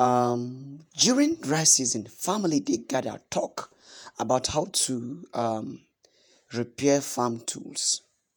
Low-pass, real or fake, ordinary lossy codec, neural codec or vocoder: none; fake; none; vocoder, 48 kHz, 128 mel bands, Vocos